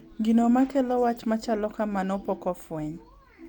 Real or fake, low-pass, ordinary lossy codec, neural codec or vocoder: real; 19.8 kHz; Opus, 32 kbps; none